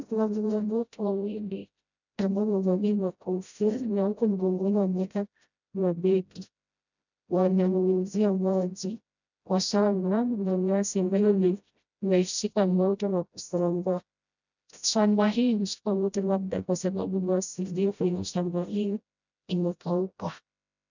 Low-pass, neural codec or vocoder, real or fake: 7.2 kHz; codec, 16 kHz, 0.5 kbps, FreqCodec, smaller model; fake